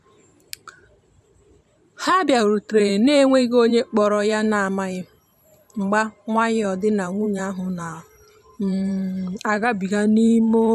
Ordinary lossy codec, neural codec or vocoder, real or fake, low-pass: none; vocoder, 44.1 kHz, 128 mel bands every 512 samples, BigVGAN v2; fake; 14.4 kHz